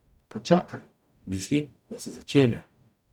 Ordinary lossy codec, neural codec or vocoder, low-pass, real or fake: none; codec, 44.1 kHz, 0.9 kbps, DAC; 19.8 kHz; fake